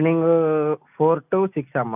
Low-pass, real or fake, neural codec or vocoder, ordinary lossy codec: 3.6 kHz; real; none; none